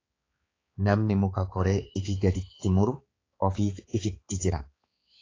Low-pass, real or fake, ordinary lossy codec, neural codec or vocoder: 7.2 kHz; fake; AAC, 32 kbps; codec, 16 kHz, 4 kbps, X-Codec, HuBERT features, trained on balanced general audio